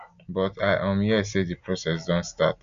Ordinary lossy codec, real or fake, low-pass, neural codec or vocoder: none; real; 7.2 kHz; none